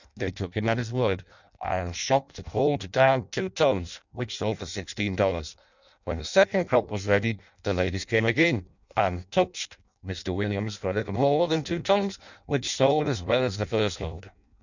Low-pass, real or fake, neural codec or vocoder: 7.2 kHz; fake; codec, 16 kHz in and 24 kHz out, 0.6 kbps, FireRedTTS-2 codec